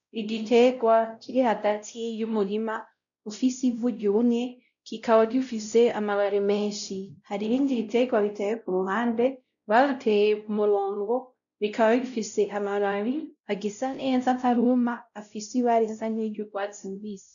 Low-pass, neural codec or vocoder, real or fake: 7.2 kHz; codec, 16 kHz, 0.5 kbps, X-Codec, WavLM features, trained on Multilingual LibriSpeech; fake